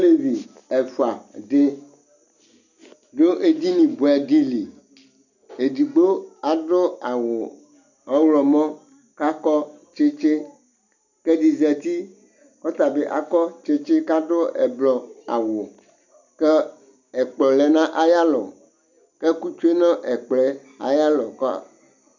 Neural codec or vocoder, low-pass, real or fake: none; 7.2 kHz; real